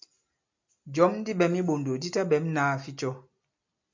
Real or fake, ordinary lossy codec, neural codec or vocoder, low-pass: real; MP3, 64 kbps; none; 7.2 kHz